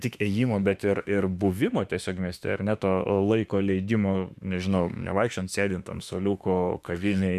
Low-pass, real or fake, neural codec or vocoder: 14.4 kHz; fake; autoencoder, 48 kHz, 32 numbers a frame, DAC-VAE, trained on Japanese speech